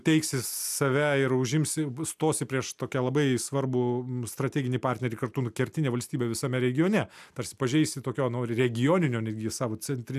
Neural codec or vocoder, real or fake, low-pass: none; real; 14.4 kHz